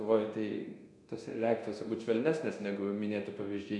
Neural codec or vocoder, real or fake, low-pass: codec, 24 kHz, 0.9 kbps, DualCodec; fake; 10.8 kHz